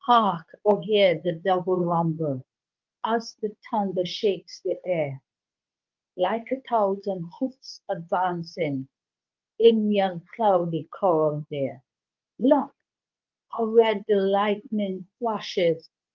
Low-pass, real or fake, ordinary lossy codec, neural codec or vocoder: 7.2 kHz; fake; Opus, 24 kbps; codec, 24 kHz, 0.9 kbps, WavTokenizer, medium speech release version 2